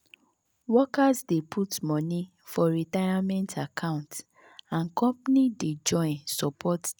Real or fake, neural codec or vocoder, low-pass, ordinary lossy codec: real; none; none; none